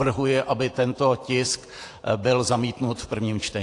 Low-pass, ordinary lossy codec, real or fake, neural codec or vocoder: 10.8 kHz; AAC, 48 kbps; fake; vocoder, 48 kHz, 128 mel bands, Vocos